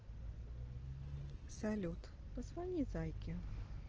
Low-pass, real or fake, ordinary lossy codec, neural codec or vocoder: 7.2 kHz; real; Opus, 16 kbps; none